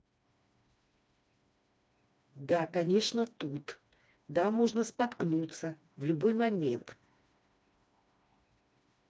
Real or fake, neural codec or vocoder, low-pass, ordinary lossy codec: fake; codec, 16 kHz, 2 kbps, FreqCodec, smaller model; none; none